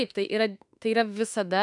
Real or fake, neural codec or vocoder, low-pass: fake; autoencoder, 48 kHz, 32 numbers a frame, DAC-VAE, trained on Japanese speech; 10.8 kHz